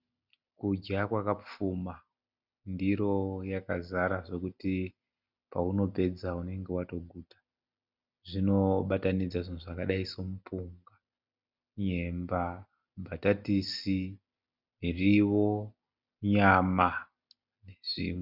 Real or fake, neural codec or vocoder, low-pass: real; none; 5.4 kHz